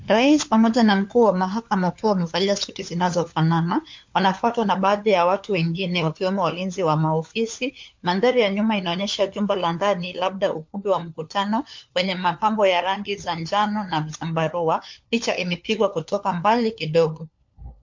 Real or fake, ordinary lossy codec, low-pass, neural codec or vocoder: fake; MP3, 48 kbps; 7.2 kHz; codec, 16 kHz, 4 kbps, FunCodec, trained on LibriTTS, 50 frames a second